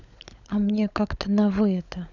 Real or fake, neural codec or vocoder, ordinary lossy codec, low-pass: fake; codec, 16 kHz, 16 kbps, FunCodec, trained on LibriTTS, 50 frames a second; none; 7.2 kHz